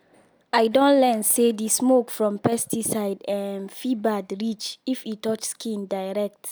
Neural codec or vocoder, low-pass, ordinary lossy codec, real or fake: none; none; none; real